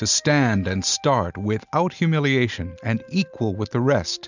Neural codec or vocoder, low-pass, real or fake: none; 7.2 kHz; real